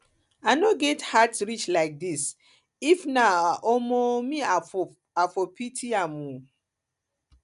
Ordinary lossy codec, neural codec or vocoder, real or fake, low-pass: none; none; real; 10.8 kHz